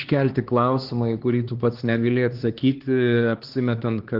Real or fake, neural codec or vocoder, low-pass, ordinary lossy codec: fake; codec, 16 kHz, 2 kbps, X-Codec, HuBERT features, trained on LibriSpeech; 5.4 kHz; Opus, 16 kbps